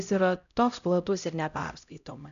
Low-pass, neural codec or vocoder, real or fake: 7.2 kHz; codec, 16 kHz, 0.5 kbps, X-Codec, HuBERT features, trained on LibriSpeech; fake